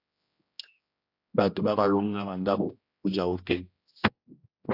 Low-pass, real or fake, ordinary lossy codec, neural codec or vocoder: 5.4 kHz; fake; AAC, 32 kbps; codec, 16 kHz, 1 kbps, X-Codec, HuBERT features, trained on general audio